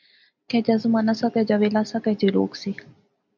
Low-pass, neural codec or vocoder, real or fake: 7.2 kHz; none; real